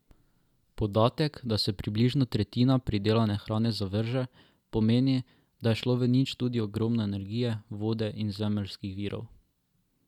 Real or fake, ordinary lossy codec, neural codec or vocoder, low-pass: real; none; none; 19.8 kHz